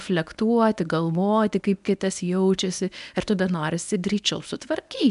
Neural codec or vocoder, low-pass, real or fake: codec, 24 kHz, 0.9 kbps, WavTokenizer, medium speech release version 1; 10.8 kHz; fake